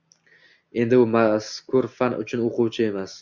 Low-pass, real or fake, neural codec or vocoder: 7.2 kHz; real; none